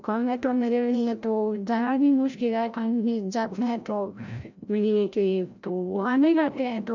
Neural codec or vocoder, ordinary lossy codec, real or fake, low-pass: codec, 16 kHz, 0.5 kbps, FreqCodec, larger model; none; fake; 7.2 kHz